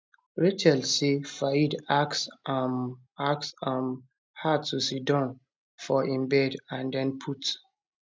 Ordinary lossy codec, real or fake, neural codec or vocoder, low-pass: none; real; none; none